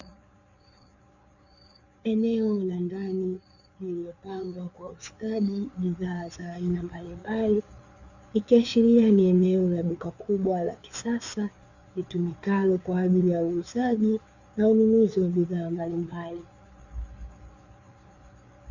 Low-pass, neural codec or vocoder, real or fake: 7.2 kHz; codec, 16 kHz, 4 kbps, FreqCodec, larger model; fake